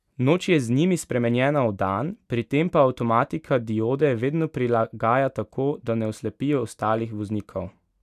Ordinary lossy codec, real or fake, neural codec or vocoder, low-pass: none; real; none; 14.4 kHz